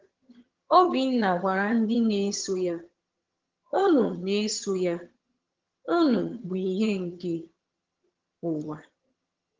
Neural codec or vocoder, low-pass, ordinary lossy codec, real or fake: vocoder, 22.05 kHz, 80 mel bands, HiFi-GAN; 7.2 kHz; Opus, 16 kbps; fake